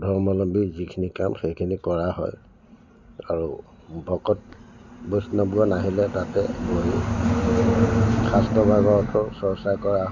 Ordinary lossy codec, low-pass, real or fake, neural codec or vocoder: none; 7.2 kHz; real; none